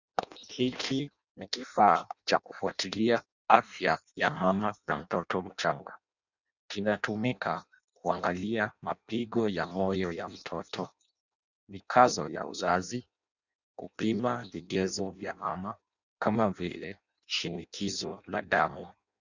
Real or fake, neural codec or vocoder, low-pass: fake; codec, 16 kHz in and 24 kHz out, 0.6 kbps, FireRedTTS-2 codec; 7.2 kHz